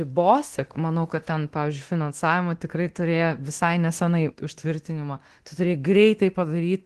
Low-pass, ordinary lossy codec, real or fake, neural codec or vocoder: 10.8 kHz; Opus, 16 kbps; fake; codec, 24 kHz, 0.9 kbps, DualCodec